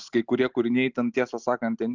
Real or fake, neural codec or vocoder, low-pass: real; none; 7.2 kHz